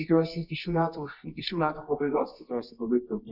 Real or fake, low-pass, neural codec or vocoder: fake; 5.4 kHz; codec, 24 kHz, 0.9 kbps, WavTokenizer, medium music audio release